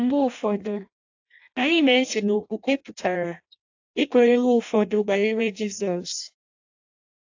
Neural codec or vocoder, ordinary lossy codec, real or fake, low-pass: codec, 16 kHz in and 24 kHz out, 0.6 kbps, FireRedTTS-2 codec; AAC, 48 kbps; fake; 7.2 kHz